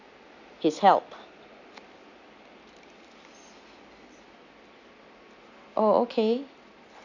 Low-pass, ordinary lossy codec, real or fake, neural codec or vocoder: 7.2 kHz; none; real; none